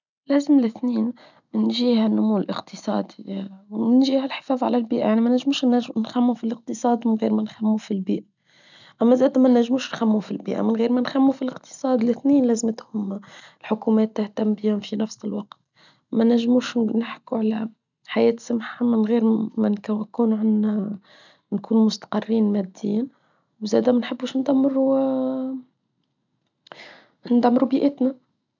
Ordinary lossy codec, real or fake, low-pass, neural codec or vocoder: none; real; 7.2 kHz; none